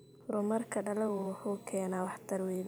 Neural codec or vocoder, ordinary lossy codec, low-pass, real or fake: vocoder, 44.1 kHz, 128 mel bands every 512 samples, BigVGAN v2; none; none; fake